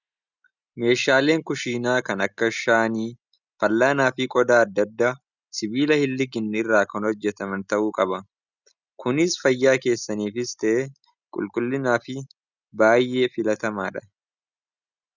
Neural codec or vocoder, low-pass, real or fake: none; 7.2 kHz; real